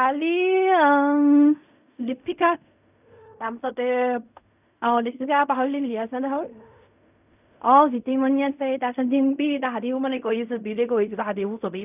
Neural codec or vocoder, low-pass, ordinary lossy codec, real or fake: codec, 16 kHz in and 24 kHz out, 0.4 kbps, LongCat-Audio-Codec, fine tuned four codebook decoder; 3.6 kHz; none; fake